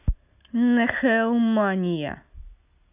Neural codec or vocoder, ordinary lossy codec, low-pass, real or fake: none; none; 3.6 kHz; real